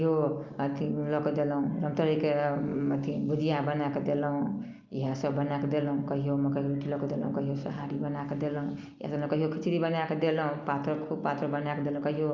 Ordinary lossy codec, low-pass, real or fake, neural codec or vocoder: Opus, 24 kbps; 7.2 kHz; real; none